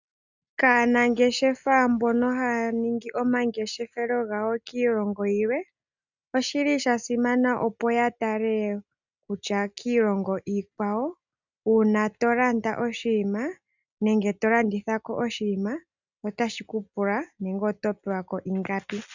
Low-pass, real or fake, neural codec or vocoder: 7.2 kHz; real; none